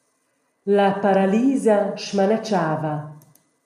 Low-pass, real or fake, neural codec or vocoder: 14.4 kHz; real; none